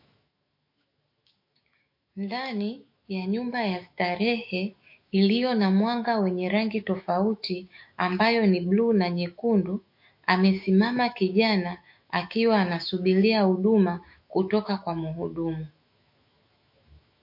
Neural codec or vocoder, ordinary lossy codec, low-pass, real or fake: autoencoder, 48 kHz, 128 numbers a frame, DAC-VAE, trained on Japanese speech; MP3, 32 kbps; 5.4 kHz; fake